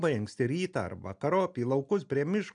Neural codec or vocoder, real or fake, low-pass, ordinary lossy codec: none; real; 9.9 kHz; MP3, 96 kbps